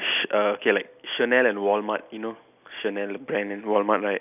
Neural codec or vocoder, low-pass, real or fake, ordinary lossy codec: none; 3.6 kHz; real; none